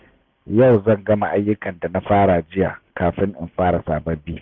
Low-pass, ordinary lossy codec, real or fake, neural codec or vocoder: 7.2 kHz; none; real; none